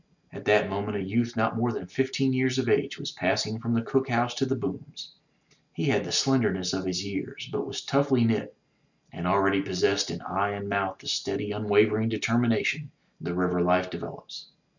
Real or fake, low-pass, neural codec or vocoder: real; 7.2 kHz; none